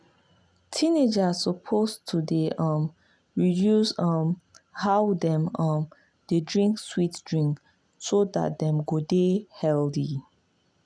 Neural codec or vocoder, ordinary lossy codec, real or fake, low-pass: none; none; real; none